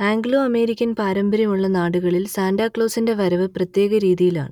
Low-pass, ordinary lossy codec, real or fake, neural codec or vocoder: 19.8 kHz; none; real; none